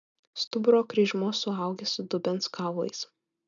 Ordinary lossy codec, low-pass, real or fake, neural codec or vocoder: MP3, 96 kbps; 7.2 kHz; real; none